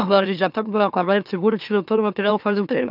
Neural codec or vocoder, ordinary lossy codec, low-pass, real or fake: autoencoder, 44.1 kHz, a latent of 192 numbers a frame, MeloTTS; none; 5.4 kHz; fake